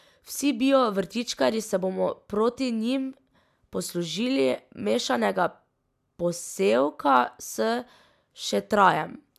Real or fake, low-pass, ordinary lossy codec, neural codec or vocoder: real; 14.4 kHz; AAC, 96 kbps; none